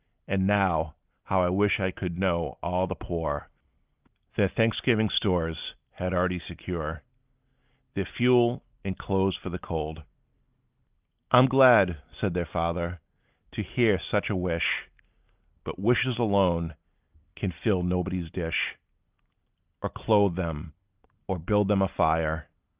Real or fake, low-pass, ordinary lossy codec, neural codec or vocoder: real; 3.6 kHz; Opus, 24 kbps; none